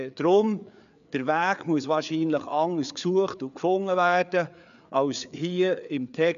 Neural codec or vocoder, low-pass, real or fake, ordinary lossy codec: codec, 16 kHz, 4 kbps, FreqCodec, larger model; 7.2 kHz; fake; none